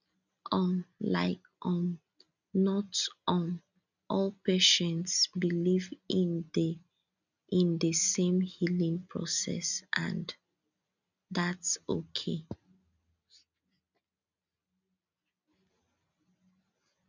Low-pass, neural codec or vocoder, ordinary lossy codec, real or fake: 7.2 kHz; none; none; real